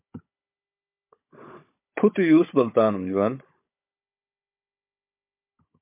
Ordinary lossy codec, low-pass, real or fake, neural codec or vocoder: MP3, 24 kbps; 3.6 kHz; fake; codec, 16 kHz, 16 kbps, FunCodec, trained on Chinese and English, 50 frames a second